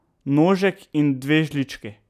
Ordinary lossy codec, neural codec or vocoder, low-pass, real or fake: none; none; 14.4 kHz; real